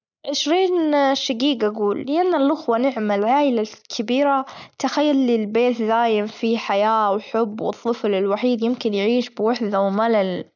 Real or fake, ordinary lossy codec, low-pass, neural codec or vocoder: real; none; 7.2 kHz; none